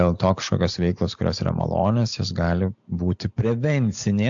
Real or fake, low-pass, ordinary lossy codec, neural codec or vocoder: real; 7.2 kHz; AAC, 64 kbps; none